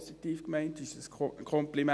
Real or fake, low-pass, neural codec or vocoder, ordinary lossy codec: real; none; none; none